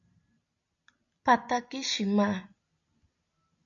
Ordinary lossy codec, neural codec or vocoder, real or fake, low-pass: AAC, 64 kbps; none; real; 7.2 kHz